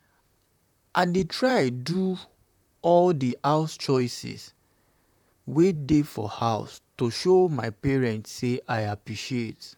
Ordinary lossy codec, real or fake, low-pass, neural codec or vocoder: none; fake; 19.8 kHz; vocoder, 44.1 kHz, 128 mel bands, Pupu-Vocoder